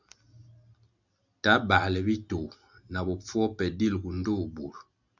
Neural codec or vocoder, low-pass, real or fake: none; 7.2 kHz; real